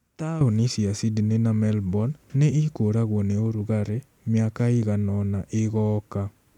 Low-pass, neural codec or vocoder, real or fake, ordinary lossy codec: 19.8 kHz; none; real; none